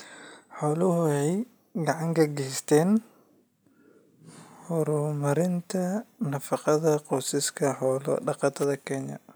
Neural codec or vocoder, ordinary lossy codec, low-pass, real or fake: none; none; none; real